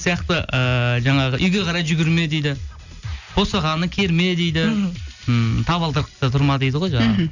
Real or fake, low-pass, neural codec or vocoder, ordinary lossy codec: real; 7.2 kHz; none; none